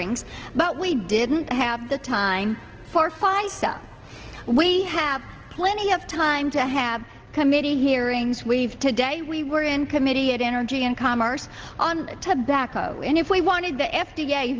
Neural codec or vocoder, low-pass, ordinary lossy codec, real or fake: none; 7.2 kHz; Opus, 16 kbps; real